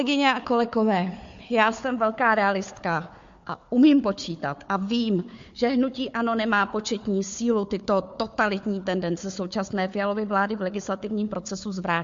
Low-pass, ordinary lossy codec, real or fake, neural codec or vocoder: 7.2 kHz; MP3, 48 kbps; fake; codec, 16 kHz, 4 kbps, FunCodec, trained on Chinese and English, 50 frames a second